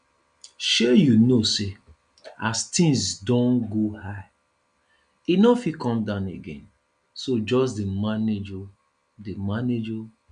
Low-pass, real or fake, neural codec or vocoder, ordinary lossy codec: 9.9 kHz; real; none; none